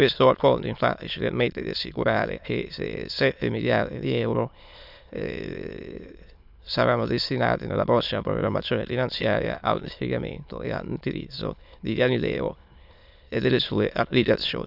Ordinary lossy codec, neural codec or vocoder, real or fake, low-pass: none; autoencoder, 22.05 kHz, a latent of 192 numbers a frame, VITS, trained on many speakers; fake; 5.4 kHz